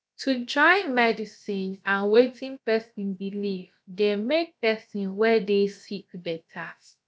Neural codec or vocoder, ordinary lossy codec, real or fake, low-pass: codec, 16 kHz, about 1 kbps, DyCAST, with the encoder's durations; none; fake; none